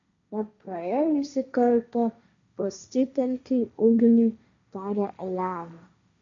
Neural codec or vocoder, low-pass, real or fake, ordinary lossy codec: codec, 16 kHz, 1.1 kbps, Voila-Tokenizer; 7.2 kHz; fake; MP3, 96 kbps